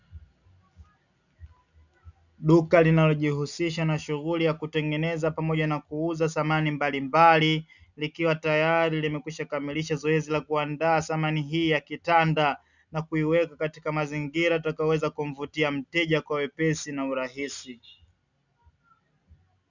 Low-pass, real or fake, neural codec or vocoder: 7.2 kHz; real; none